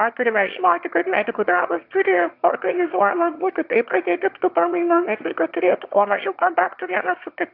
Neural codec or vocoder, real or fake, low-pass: autoencoder, 22.05 kHz, a latent of 192 numbers a frame, VITS, trained on one speaker; fake; 5.4 kHz